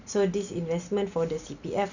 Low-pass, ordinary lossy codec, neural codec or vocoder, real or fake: 7.2 kHz; none; none; real